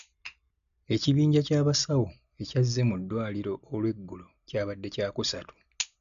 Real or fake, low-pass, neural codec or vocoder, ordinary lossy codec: real; 7.2 kHz; none; none